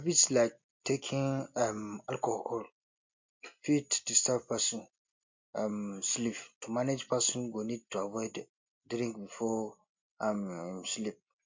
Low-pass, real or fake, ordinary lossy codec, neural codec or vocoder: 7.2 kHz; real; MP3, 48 kbps; none